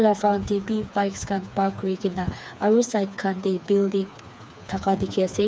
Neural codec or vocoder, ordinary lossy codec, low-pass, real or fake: codec, 16 kHz, 4 kbps, FreqCodec, smaller model; none; none; fake